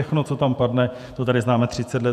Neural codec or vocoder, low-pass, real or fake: vocoder, 48 kHz, 128 mel bands, Vocos; 14.4 kHz; fake